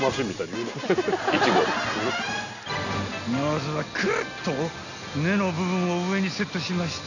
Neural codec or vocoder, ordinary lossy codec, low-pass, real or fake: none; none; 7.2 kHz; real